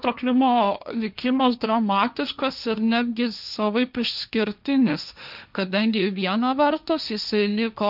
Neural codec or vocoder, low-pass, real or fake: codec, 16 kHz, 1.1 kbps, Voila-Tokenizer; 5.4 kHz; fake